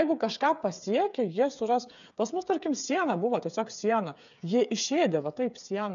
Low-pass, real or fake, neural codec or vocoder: 7.2 kHz; fake; codec, 16 kHz, 8 kbps, FreqCodec, smaller model